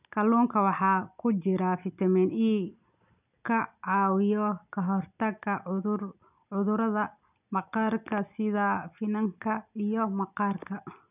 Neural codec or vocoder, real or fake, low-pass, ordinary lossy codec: none; real; 3.6 kHz; none